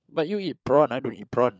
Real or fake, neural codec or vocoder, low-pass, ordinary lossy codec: fake; codec, 16 kHz, 16 kbps, FunCodec, trained on LibriTTS, 50 frames a second; none; none